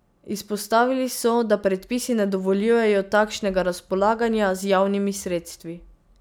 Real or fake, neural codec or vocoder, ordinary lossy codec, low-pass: real; none; none; none